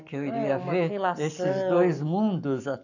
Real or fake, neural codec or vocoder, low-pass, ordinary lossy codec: fake; codec, 44.1 kHz, 7.8 kbps, Pupu-Codec; 7.2 kHz; none